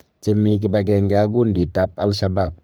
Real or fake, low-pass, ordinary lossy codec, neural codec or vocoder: fake; none; none; codec, 44.1 kHz, 3.4 kbps, Pupu-Codec